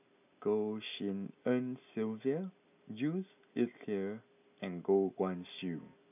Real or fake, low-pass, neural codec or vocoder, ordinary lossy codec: real; 3.6 kHz; none; none